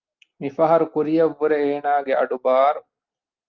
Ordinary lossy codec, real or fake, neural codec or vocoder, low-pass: Opus, 32 kbps; real; none; 7.2 kHz